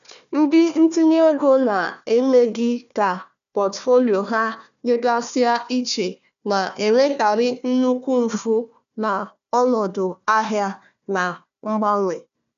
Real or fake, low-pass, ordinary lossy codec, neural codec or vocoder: fake; 7.2 kHz; none; codec, 16 kHz, 1 kbps, FunCodec, trained on Chinese and English, 50 frames a second